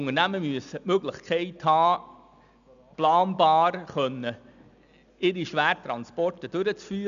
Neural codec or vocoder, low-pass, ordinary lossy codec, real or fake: none; 7.2 kHz; none; real